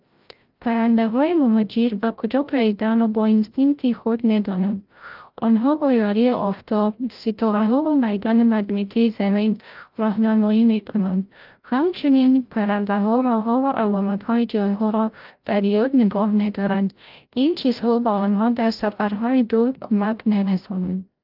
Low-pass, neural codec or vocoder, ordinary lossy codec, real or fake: 5.4 kHz; codec, 16 kHz, 0.5 kbps, FreqCodec, larger model; Opus, 32 kbps; fake